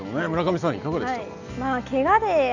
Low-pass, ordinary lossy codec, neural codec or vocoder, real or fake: 7.2 kHz; none; none; real